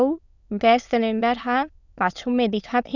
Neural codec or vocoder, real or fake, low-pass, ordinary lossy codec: autoencoder, 22.05 kHz, a latent of 192 numbers a frame, VITS, trained on many speakers; fake; 7.2 kHz; none